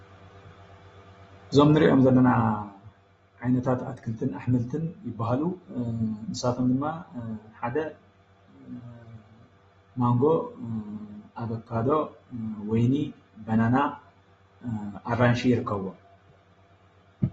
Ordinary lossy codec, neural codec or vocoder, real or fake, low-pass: AAC, 24 kbps; none; real; 19.8 kHz